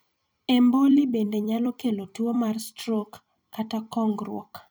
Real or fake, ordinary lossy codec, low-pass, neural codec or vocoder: fake; none; none; vocoder, 44.1 kHz, 128 mel bands every 256 samples, BigVGAN v2